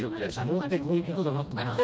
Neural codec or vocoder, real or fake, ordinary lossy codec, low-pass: codec, 16 kHz, 1 kbps, FreqCodec, smaller model; fake; none; none